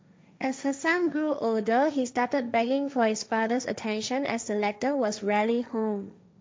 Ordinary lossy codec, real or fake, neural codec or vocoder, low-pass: none; fake; codec, 16 kHz, 1.1 kbps, Voila-Tokenizer; none